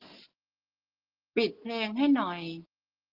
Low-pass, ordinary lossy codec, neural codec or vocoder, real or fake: 5.4 kHz; Opus, 16 kbps; none; real